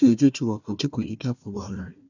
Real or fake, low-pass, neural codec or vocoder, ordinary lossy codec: fake; 7.2 kHz; codec, 16 kHz, 1 kbps, FunCodec, trained on Chinese and English, 50 frames a second; none